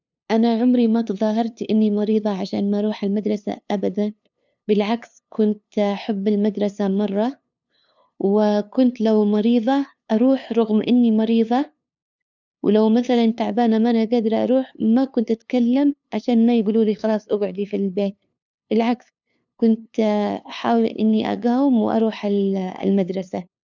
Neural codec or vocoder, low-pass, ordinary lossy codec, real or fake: codec, 16 kHz, 2 kbps, FunCodec, trained on LibriTTS, 25 frames a second; 7.2 kHz; none; fake